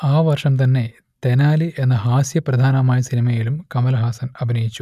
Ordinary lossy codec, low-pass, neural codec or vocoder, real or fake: none; 14.4 kHz; none; real